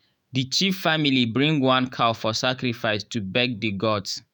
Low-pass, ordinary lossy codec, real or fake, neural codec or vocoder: none; none; fake; autoencoder, 48 kHz, 128 numbers a frame, DAC-VAE, trained on Japanese speech